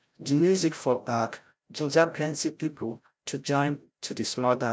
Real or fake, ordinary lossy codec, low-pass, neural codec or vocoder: fake; none; none; codec, 16 kHz, 0.5 kbps, FreqCodec, larger model